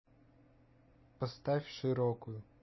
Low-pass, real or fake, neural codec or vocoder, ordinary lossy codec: 7.2 kHz; real; none; MP3, 24 kbps